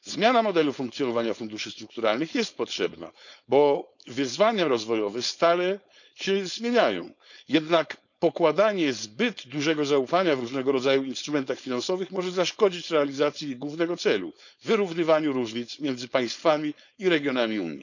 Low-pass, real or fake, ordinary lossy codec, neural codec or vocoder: 7.2 kHz; fake; none; codec, 16 kHz, 4.8 kbps, FACodec